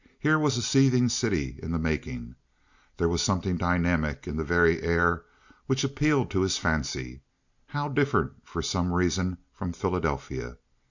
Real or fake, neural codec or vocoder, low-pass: real; none; 7.2 kHz